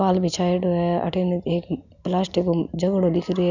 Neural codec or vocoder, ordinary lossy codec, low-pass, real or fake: none; none; 7.2 kHz; real